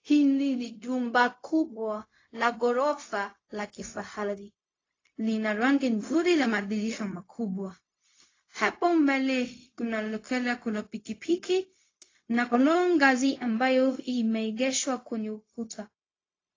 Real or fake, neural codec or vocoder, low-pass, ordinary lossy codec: fake; codec, 16 kHz, 0.4 kbps, LongCat-Audio-Codec; 7.2 kHz; AAC, 32 kbps